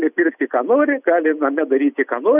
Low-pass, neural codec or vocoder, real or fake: 3.6 kHz; none; real